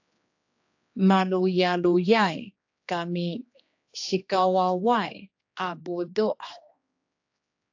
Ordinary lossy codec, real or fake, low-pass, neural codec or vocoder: AAC, 48 kbps; fake; 7.2 kHz; codec, 16 kHz, 2 kbps, X-Codec, HuBERT features, trained on general audio